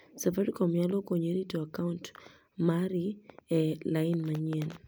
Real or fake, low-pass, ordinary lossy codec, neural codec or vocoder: real; none; none; none